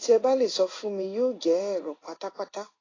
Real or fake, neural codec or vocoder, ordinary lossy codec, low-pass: fake; codec, 16 kHz in and 24 kHz out, 1 kbps, XY-Tokenizer; AAC, 32 kbps; 7.2 kHz